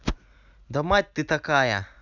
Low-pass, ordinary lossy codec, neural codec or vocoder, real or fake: 7.2 kHz; none; none; real